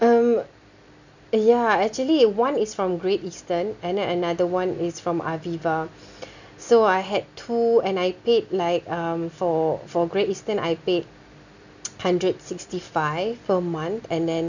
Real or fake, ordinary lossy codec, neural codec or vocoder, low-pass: real; none; none; 7.2 kHz